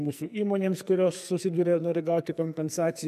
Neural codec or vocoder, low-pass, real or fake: codec, 44.1 kHz, 2.6 kbps, SNAC; 14.4 kHz; fake